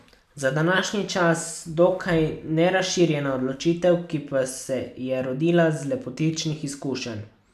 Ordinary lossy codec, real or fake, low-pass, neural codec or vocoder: none; real; 14.4 kHz; none